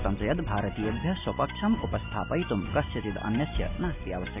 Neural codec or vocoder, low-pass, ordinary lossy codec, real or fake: none; 3.6 kHz; none; real